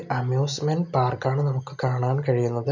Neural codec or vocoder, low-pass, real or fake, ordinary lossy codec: none; 7.2 kHz; real; none